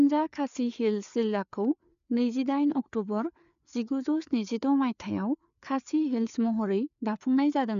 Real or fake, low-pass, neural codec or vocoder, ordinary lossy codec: fake; 7.2 kHz; codec, 16 kHz, 4 kbps, FreqCodec, larger model; none